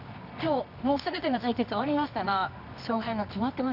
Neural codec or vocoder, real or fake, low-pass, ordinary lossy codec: codec, 24 kHz, 0.9 kbps, WavTokenizer, medium music audio release; fake; 5.4 kHz; none